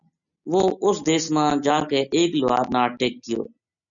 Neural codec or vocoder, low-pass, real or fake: none; 7.2 kHz; real